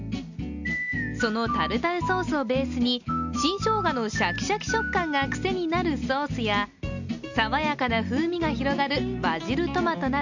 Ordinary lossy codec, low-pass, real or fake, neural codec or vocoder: none; 7.2 kHz; real; none